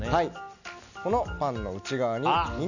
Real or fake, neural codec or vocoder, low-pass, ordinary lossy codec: real; none; 7.2 kHz; none